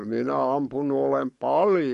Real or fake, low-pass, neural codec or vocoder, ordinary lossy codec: fake; 14.4 kHz; codec, 44.1 kHz, 7.8 kbps, Pupu-Codec; MP3, 48 kbps